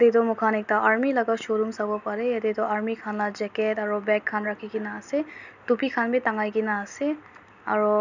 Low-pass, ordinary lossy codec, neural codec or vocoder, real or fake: 7.2 kHz; none; none; real